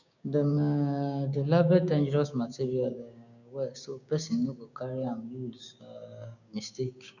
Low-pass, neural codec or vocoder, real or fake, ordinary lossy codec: 7.2 kHz; codec, 16 kHz, 6 kbps, DAC; fake; none